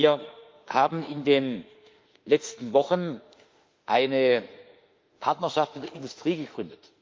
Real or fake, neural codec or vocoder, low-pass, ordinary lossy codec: fake; autoencoder, 48 kHz, 32 numbers a frame, DAC-VAE, trained on Japanese speech; 7.2 kHz; Opus, 24 kbps